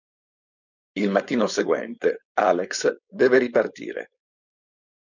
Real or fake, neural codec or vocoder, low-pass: fake; codec, 16 kHz, 4.8 kbps, FACodec; 7.2 kHz